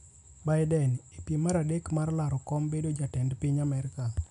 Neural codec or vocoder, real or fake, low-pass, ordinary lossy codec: none; real; 10.8 kHz; none